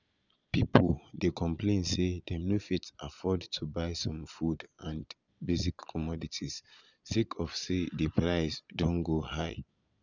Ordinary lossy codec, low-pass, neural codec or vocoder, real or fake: none; 7.2 kHz; vocoder, 22.05 kHz, 80 mel bands, Vocos; fake